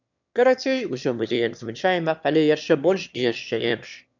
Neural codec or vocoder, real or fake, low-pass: autoencoder, 22.05 kHz, a latent of 192 numbers a frame, VITS, trained on one speaker; fake; 7.2 kHz